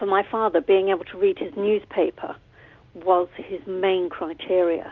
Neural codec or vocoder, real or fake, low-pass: none; real; 7.2 kHz